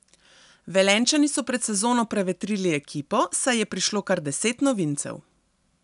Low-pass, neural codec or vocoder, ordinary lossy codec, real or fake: 10.8 kHz; none; none; real